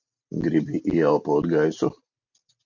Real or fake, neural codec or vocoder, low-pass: real; none; 7.2 kHz